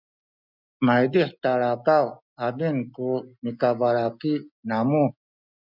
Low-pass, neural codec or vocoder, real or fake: 5.4 kHz; none; real